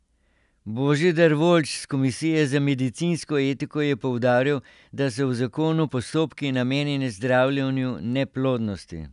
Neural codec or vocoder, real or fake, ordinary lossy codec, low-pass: none; real; none; 10.8 kHz